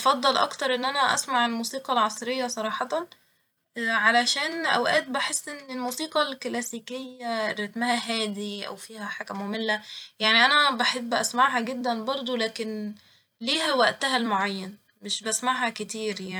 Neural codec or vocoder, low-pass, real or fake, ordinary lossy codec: vocoder, 44.1 kHz, 128 mel bands every 256 samples, BigVGAN v2; none; fake; none